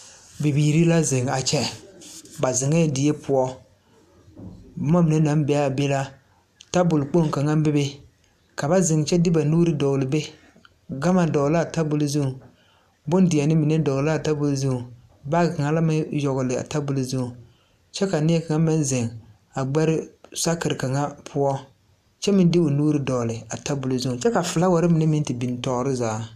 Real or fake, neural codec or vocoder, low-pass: real; none; 14.4 kHz